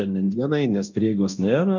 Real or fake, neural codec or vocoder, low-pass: fake; codec, 24 kHz, 0.9 kbps, DualCodec; 7.2 kHz